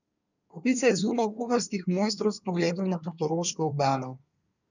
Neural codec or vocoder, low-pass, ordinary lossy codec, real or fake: codec, 24 kHz, 1 kbps, SNAC; 7.2 kHz; none; fake